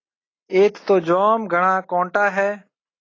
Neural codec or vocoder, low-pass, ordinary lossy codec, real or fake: none; 7.2 kHz; AAC, 32 kbps; real